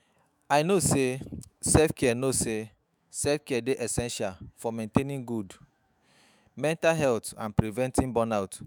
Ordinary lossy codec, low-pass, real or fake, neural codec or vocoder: none; none; fake; autoencoder, 48 kHz, 128 numbers a frame, DAC-VAE, trained on Japanese speech